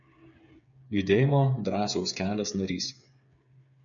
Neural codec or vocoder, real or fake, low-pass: codec, 16 kHz, 8 kbps, FreqCodec, smaller model; fake; 7.2 kHz